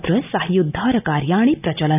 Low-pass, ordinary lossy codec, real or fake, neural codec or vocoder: 3.6 kHz; none; real; none